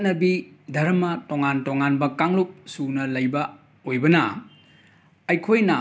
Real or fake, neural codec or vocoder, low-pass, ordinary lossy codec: real; none; none; none